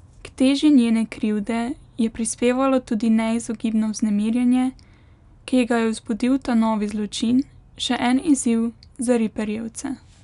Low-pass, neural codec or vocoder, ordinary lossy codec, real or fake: 10.8 kHz; none; none; real